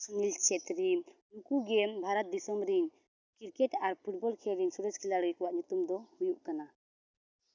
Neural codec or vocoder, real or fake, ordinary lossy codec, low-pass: none; real; none; 7.2 kHz